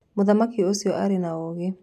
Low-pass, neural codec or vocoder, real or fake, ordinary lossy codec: 14.4 kHz; none; real; none